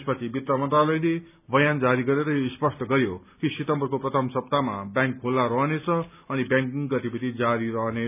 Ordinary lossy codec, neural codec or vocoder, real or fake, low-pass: none; none; real; 3.6 kHz